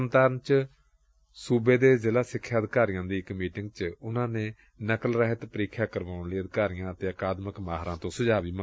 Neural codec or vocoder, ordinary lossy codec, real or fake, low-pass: none; none; real; none